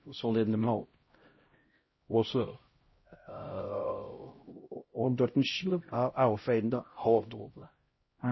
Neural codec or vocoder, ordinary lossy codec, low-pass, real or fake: codec, 16 kHz, 0.5 kbps, X-Codec, HuBERT features, trained on LibriSpeech; MP3, 24 kbps; 7.2 kHz; fake